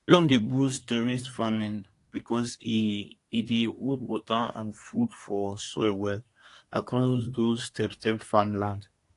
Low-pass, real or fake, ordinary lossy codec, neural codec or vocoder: 10.8 kHz; fake; AAC, 48 kbps; codec, 24 kHz, 1 kbps, SNAC